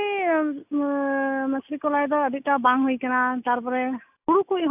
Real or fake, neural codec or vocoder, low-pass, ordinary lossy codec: real; none; 3.6 kHz; AAC, 32 kbps